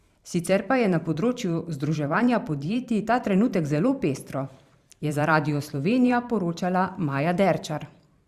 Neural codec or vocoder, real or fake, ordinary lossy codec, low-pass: vocoder, 44.1 kHz, 128 mel bands every 512 samples, BigVGAN v2; fake; Opus, 64 kbps; 14.4 kHz